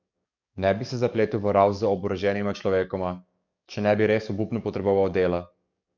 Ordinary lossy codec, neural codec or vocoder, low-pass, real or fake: none; codec, 44.1 kHz, 7.8 kbps, DAC; 7.2 kHz; fake